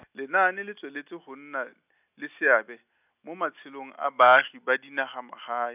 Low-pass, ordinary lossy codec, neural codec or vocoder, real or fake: 3.6 kHz; none; none; real